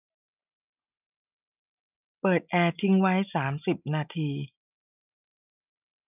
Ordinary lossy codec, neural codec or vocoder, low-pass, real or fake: none; none; 3.6 kHz; real